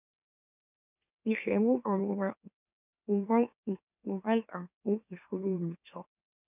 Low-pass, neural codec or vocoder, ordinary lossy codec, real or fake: 3.6 kHz; autoencoder, 44.1 kHz, a latent of 192 numbers a frame, MeloTTS; none; fake